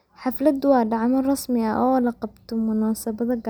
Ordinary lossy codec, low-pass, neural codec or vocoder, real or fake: none; none; none; real